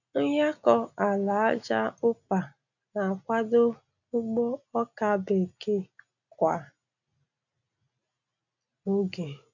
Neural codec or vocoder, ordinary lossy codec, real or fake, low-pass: none; none; real; 7.2 kHz